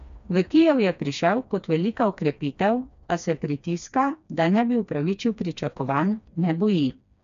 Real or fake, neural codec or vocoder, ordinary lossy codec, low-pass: fake; codec, 16 kHz, 2 kbps, FreqCodec, smaller model; AAC, 96 kbps; 7.2 kHz